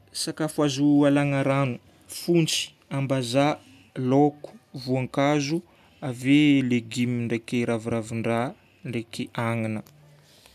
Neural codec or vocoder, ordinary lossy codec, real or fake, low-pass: none; none; real; 14.4 kHz